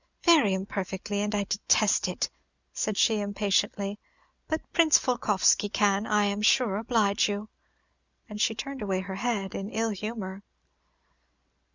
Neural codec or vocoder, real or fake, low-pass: none; real; 7.2 kHz